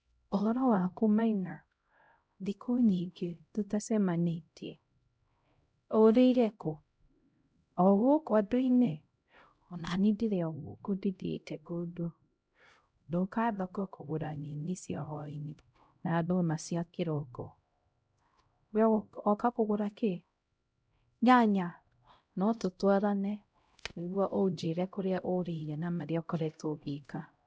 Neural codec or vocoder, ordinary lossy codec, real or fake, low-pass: codec, 16 kHz, 0.5 kbps, X-Codec, HuBERT features, trained on LibriSpeech; none; fake; none